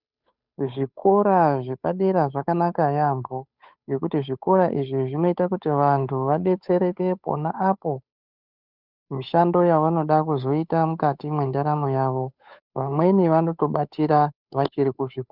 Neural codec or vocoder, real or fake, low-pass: codec, 16 kHz, 8 kbps, FunCodec, trained on Chinese and English, 25 frames a second; fake; 5.4 kHz